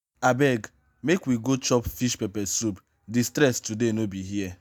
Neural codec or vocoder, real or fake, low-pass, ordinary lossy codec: none; real; none; none